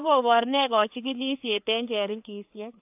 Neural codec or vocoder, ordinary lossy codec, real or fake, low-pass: codec, 16 kHz, 2 kbps, FreqCodec, larger model; AAC, 32 kbps; fake; 3.6 kHz